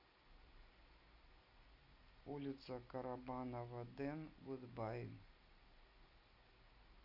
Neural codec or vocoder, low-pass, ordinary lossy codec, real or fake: none; 5.4 kHz; Opus, 64 kbps; real